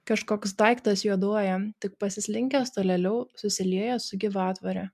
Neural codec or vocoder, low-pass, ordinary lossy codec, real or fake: none; 14.4 kHz; MP3, 96 kbps; real